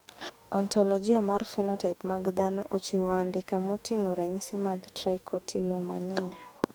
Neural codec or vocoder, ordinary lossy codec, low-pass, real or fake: codec, 44.1 kHz, 2.6 kbps, DAC; none; none; fake